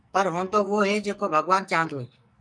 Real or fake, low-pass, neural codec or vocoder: fake; 9.9 kHz; codec, 44.1 kHz, 2.6 kbps, SNAC